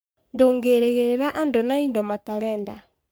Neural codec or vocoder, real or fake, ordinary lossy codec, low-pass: codec, 44.1 kHz, 3.4 kbps, Pupu-Codec; fake; none; none